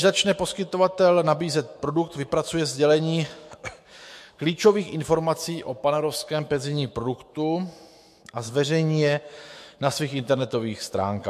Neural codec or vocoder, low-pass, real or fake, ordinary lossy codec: autoencoder, 48 kHz, 128 numbers a frame, DAC-VAE, trained on Japanese speech; 14.4 kHz; fake; MP3, 64 kbps